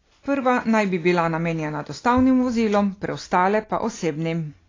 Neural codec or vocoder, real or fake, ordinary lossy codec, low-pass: none; real; AAC, 32 kbps; 7.2 kHz